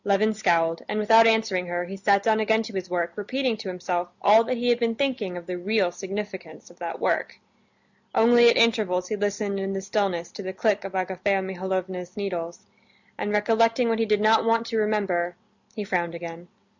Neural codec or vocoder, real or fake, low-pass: none; real; 7.2 kHz